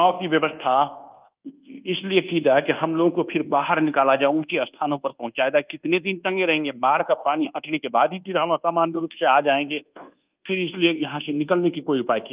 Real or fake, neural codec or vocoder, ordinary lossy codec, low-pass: fake; codec, 24 kHz, 1.2 kbps, DualCodec; Opus, 24 kbps; 3.6 kHz